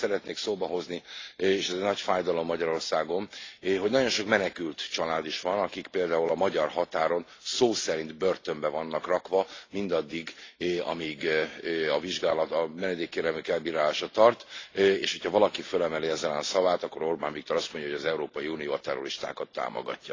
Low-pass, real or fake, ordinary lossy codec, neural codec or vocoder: 7.2 kHz; real; AAC, 32 kbps; none